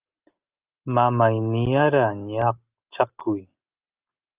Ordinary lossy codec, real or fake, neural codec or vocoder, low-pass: Opus, 24 kbps; real; none; 3.6 kHz